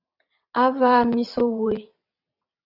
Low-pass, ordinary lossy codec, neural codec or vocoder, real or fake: 5.4 kHz; AAC, 48 kbps; vocoder, 22.05 kHz, 80 mel bands, WaveNeXt; fake